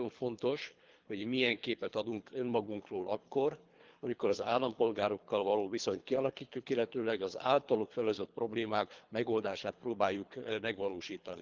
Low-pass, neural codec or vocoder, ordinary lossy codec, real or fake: 7.2 kHz; codec, 24 kHz, 3 kbps, HILCodec; Opus, 32 kbps; fake